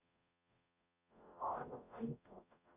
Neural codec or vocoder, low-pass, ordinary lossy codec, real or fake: codec, 44.1 kHz, 0.9 kbps, DAC; 3.6 kHz; Opus, 64 kbps; fake